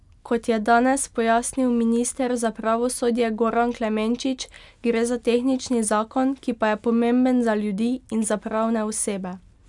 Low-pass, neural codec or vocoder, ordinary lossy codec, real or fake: 10.8 kHz; none; none; real